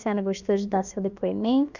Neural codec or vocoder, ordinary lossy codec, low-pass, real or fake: codec, 16 kHz, about 1 kbps, DyCAST, with the encoder's durations; none; 7.2 kHz; fake